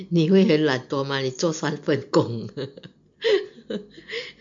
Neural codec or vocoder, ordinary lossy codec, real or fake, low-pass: none; MP3, 48 kbps; real; 7.2 kHz